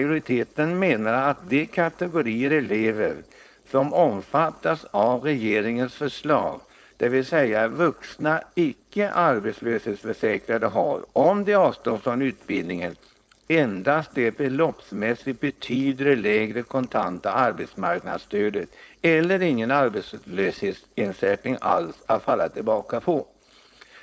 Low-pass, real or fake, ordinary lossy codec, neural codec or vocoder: none; fake; none; codec, 16 kHz, 4.8 kbps, FACodec